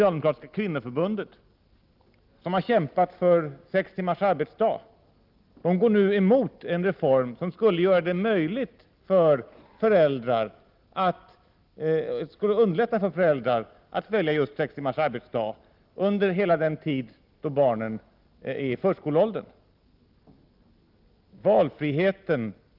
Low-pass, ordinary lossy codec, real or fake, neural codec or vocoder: 5.4 kHz; Opus, 32 kbps; real; none